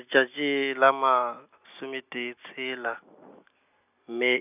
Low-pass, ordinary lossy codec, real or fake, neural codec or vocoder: 3.6 kHz; none; real; none